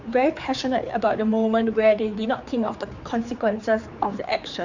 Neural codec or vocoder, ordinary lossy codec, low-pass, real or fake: codec, 16 kHz, 4 kbps, X-Codec, HuBERT features, trained on general audio; Opus, 64 kbps; 7.2 kHz; fake